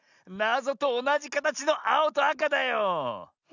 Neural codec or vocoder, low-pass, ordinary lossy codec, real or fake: none; 7.2 kHz; none; real